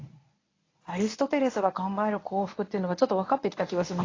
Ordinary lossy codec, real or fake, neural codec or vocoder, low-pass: AAC, 32 kbps; fake; codec, 24 kHz, 0.9 kbps, WavTokenizer, medium speech release version 1; 7.2 kHz